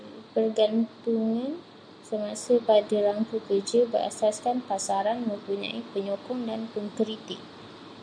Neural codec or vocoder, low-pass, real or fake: none; 9.9 kHz; real